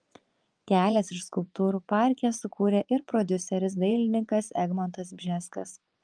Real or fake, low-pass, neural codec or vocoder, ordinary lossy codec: fake; 9.9 kHz; vocoder, 24 kHz, 100 mel bands, Vocos; Opus, 32 kbps